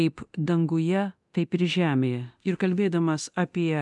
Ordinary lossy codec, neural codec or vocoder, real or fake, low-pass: MP3, 96 kbps; codec, 24 kHz, 0.9 kbps, DualCodec; fake; 10.8 kHz